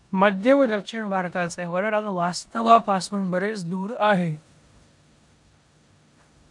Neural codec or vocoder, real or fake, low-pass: codec, 16 kHz in and 24 kHz out, 0.9 kbps, LongCat-Audio-Codec, four codebook decoder; fake; 10.8 kHz